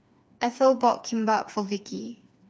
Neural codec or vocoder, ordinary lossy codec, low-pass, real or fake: codec, 16 kHz, 4 kbps, FreqCodec, smaller model; none; none; fake